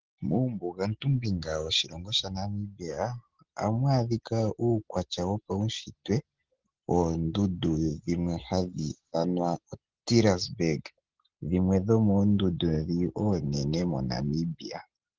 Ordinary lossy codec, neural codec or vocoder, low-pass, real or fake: Opus, 16 kbps; none; 7.2 kHz; real